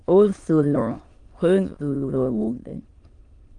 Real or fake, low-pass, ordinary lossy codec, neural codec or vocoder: fake; 9.9 kHz; Opus, 24 kbps; autoencoder, 22.05 kHz, a latent of 192 numbers a frame, VITS, trained on many speakers